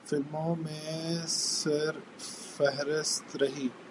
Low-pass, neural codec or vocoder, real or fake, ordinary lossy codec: 10.8 kHz; none; real; MP3, 96 kbps